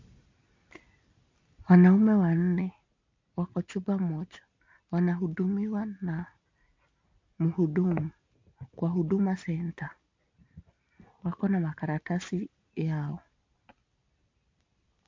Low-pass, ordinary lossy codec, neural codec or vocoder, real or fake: 7.2 kHz; MP3, 48 kbps; none; real